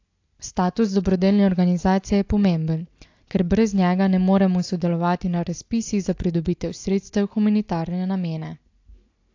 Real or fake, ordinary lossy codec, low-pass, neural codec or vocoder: real; AAC, 48 kbps; 7.2 kHz; none